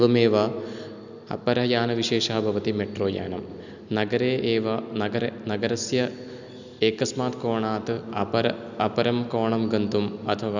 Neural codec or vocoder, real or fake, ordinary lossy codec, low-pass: none; real; none; 7.2 kHz